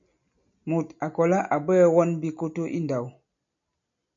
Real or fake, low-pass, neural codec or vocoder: real; 7.2 kHz; none